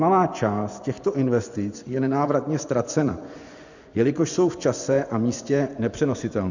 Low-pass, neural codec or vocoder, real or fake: 7.2 kHz; vocoder, 24 kHz, 100 mel bands, Vocos; fake